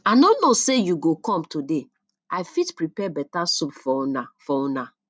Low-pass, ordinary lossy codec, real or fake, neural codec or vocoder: none; none; real; none